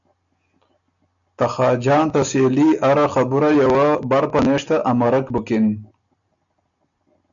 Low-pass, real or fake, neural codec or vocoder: 7.2 kHz; real; none